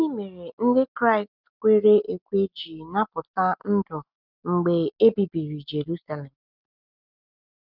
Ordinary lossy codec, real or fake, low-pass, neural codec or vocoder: none; real; 5.4 kHz; none